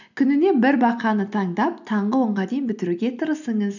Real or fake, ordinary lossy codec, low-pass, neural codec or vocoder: real; none; 7.2 kHz; none